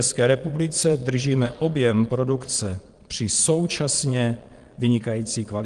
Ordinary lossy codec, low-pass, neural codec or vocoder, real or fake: Opus, 24 kbps; 9.9 kHz; vocoder, 22.05 kHz, 80 mel bands, Vocos; fake